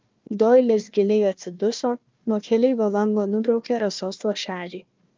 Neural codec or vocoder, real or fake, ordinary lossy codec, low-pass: codec, 16 kHz, 1 kbps, FunCodec, trained on Chinese and English, 50 frames a second; fake; Opus, 24 kbps; 7.2 kHz